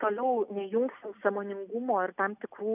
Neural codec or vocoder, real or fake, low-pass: none; real; 3.6 kHz